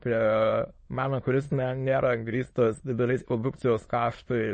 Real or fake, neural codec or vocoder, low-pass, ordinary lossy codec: fake; autoencoder, 22.05 kHz, a latent of 192 numbers a frame, VITS, trained on many speakers; 9.9 kHz; MP3, 32 kbps